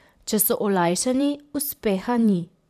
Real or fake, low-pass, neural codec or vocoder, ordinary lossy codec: fake; 14.4 kHz; vocoder, 44.1 kHz, 128 mel bands every 512 samples, BigVGAN v2; none